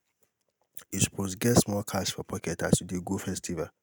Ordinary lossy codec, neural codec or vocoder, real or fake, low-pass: none; none; real; none